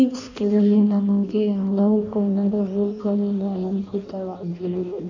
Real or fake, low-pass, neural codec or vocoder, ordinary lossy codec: fake; 7.2 kHz; codec, 16 kHz in and 24 kHz out, 0.6 kbps, FireRedTTS-2 codec; none